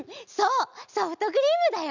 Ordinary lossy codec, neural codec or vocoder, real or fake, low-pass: none; none; real; 7.2 kHz